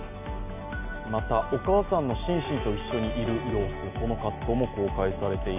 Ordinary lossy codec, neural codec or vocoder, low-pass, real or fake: none; none; 3.6 kHz; real